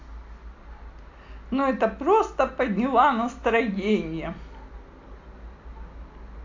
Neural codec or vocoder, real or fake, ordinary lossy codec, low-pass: none; real; AAC, 48 kbps; 7.2 kHz